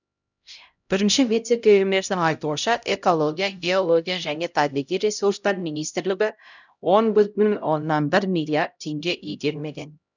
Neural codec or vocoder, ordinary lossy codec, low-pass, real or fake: codec, 16 kHz, 0.5 kbps, X-Codec, HuBERT features, trained on LibriSpeech; none; 7.2 kHz; fake